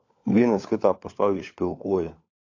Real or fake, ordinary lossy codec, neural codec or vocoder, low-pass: fake; AAC, 48 kbps; codec, 16 kHz, 4 kbps, FunCodec, trained on LibriTTS, 50 frames a second; 7.2 kHz